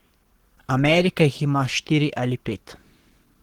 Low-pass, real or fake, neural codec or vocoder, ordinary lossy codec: 19.8 kHz; fake; codec, 44.1 kHz, 7.8 kbps, Pupu-Codec; Opus, 16 kbps